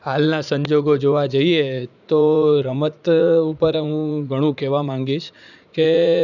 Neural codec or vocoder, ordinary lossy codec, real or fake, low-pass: vocoder, 44.1 kHz, 80 mel bands, Vocos; none; fake; 7.2 kHz